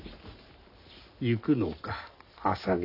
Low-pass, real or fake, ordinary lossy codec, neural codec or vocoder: 5.4 kHz; real; none; none